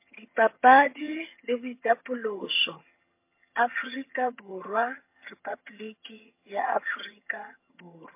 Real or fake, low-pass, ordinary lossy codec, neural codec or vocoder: fake; 3.6 kHz; MP3, 24 kbps; vocoder, 22.05 kHz, 80 mel bands, HiFi-GAN